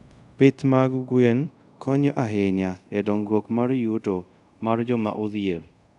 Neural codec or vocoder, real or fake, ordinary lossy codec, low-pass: codec, 24 kHz, 0.5 kbps, DualCodec; fake; none; 10.8 kHz